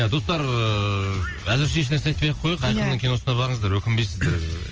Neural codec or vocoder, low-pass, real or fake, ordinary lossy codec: none; 7.2 kHz; real; Opus, 24 kbps